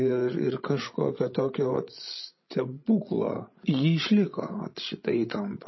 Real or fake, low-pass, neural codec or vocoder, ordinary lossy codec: fake; 7.2 kHz; codec, 16 kHz, 16 kbps, FreqCodec, larger model; MP3, 24 kbps